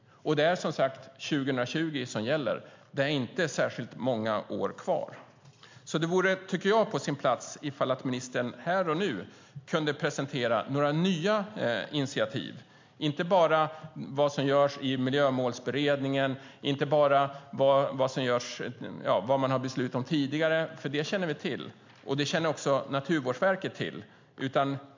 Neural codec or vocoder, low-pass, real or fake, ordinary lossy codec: none; 7.2 kHz; real; MP3, 64 kbps